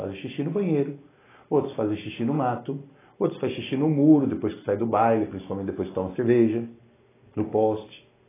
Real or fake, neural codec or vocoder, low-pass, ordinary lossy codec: real; none; 3.6 kHz; AAC, 16 kbps